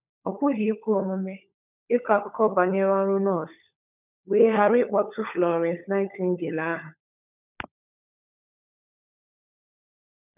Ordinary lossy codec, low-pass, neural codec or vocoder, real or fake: none; 3.6 kHz; codec, 16 kHz, 16 kbps, FunCodec, trained on LibriTTS, 50 frames a second; fake